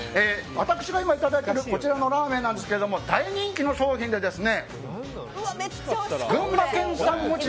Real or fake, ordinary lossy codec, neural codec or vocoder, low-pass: real; none; none; none